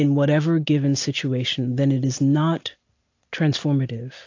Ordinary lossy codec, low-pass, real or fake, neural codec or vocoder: AAC, 48 kbps; 7.2 kHz; real; none